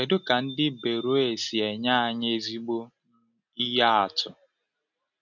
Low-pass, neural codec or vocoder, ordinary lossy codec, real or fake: 7.2 kHz; none; none; real